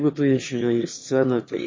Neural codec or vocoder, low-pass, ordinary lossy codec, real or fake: autoencoder, 22.05 kHz, a latent of 192 numbers a frame, VITS, trained on one speaker; 7.2 kHz; MP3, 32 kbps; fake